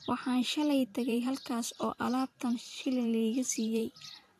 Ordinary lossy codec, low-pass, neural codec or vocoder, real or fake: AAC, 64 kbps; 14.4 kHz; vocoder, 44.1 kHz, 128 mel bands every 512 samples, BigVGAN v2; fake